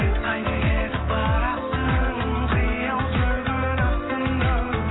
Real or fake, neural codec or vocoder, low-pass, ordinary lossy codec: real; none; 7.2 kHz; AAC, 16 kbps